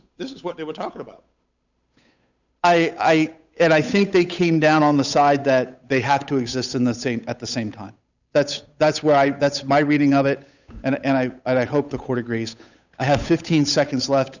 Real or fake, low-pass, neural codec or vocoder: fake; 7.2 kHz; codec, 16 kHz, 8 kbps, FunCodec, trained on Chinese and English, 25 frames a second